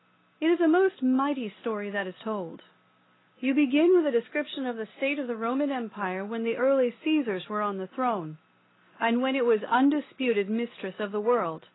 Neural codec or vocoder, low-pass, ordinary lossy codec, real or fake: none; 7.2 kHz; AAC, 16 kbps; real